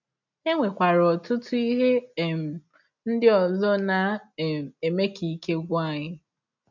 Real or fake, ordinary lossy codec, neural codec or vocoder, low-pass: real; none; none; 7.2 kHz